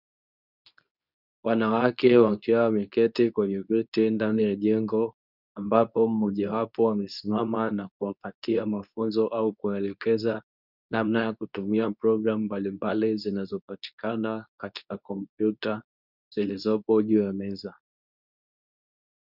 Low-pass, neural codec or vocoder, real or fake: 5.4 kHz; codec, 24 kHz, 0.9 kbps, WavTokenizer, medium speech release version 2; fake